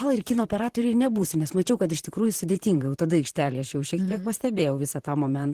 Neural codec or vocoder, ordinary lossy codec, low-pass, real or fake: vocoder, 44.1 kHz, 128 mel bands, Pupu-Vocoder; Opus, 16 kbps; 14.4 kHz; fake